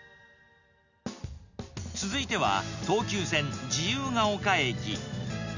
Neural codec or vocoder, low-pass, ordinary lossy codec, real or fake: none; 7.2 kHz; none; real